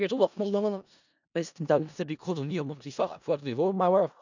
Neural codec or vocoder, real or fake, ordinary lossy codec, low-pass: codec, 16 kHz in and 24 kHz out, 0.4 kbps, LongCat-Audio-Codec, four codebook decoder; fake; none; 7.2 kHz